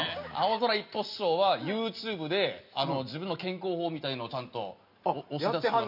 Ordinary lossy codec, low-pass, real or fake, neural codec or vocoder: none; 5.4 kHz; real; none